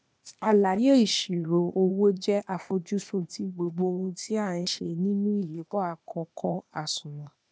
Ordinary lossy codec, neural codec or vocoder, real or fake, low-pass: none; codec, 16 kHz, 0.8 kbps, ZipCodec; fake; none